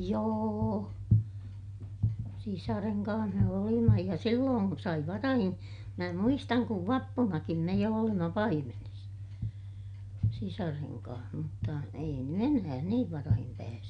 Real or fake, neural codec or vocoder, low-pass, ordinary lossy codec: real; none; 9.9 kHz; none